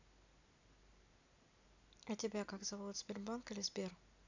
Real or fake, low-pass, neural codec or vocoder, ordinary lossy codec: real; 7.2 kHz; none; none